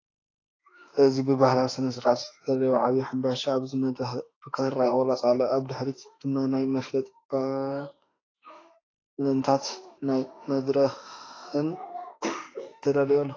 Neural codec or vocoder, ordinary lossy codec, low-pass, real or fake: autoencoder, 48 kHz, 32 numbers a frame, DAC-VAE, trained on Japanese speech; AAC, 32 kbps; 7.2 kHz; fake